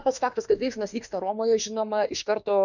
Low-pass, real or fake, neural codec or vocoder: 7.2 kHz; fake; autoencoder, 48 kHz, 32 numbers a frame, DAC-VAE, trained on Japanese speech